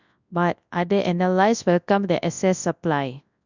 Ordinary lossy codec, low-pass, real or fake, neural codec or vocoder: none; 7.2 kHz; fake; codec, 24 kHz, 0.9 kbps, WavTokenizer, large speech release